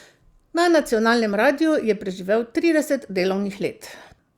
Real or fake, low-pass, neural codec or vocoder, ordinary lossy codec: real; 19.8 kHz; none; Opus, 64 kbps